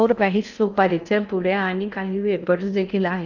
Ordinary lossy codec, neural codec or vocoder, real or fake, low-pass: none; codec, 16 kHz in and 24 kHz out, 0.6 kbps, FocalCodec, streaming, 4096 codes; fake; 7.2 kHz